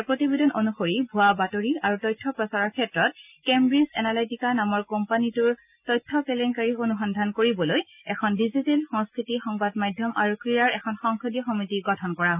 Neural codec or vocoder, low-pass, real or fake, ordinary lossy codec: none; 3.6 kHz; real; none